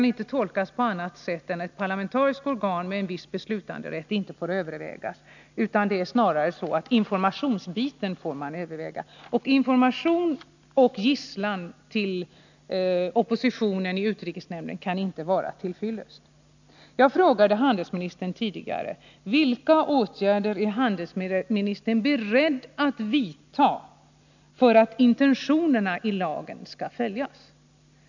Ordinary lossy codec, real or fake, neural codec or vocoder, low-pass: none; real; none; 7.2 kHz